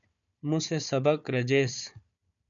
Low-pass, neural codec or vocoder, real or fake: 7.2 kHz; codec, 16 kHz, 4 kbps, FunCodec, trained on Chinese and English, 50 frames a second; fake